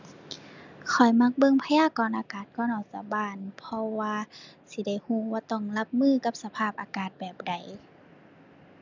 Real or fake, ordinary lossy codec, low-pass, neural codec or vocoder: real; none; 7.2 kHz; none